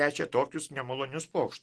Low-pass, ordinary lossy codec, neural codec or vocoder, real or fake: 10.8 kHz; Opus, 16 kbps; none; real